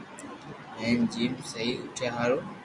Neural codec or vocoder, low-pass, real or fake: none; 10.8 kHz; real